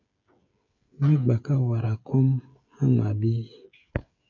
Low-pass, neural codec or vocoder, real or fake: 7.2 kHz; codec, 16 kHz, 16 kbps, FreqCodec, smaller model; fake